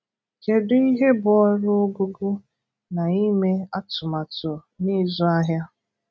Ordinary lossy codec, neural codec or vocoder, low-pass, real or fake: none; none; none; real